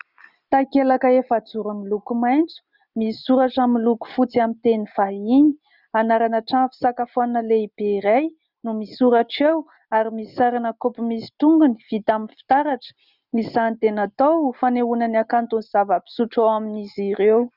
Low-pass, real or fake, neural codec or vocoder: 5.4 kHz; real; none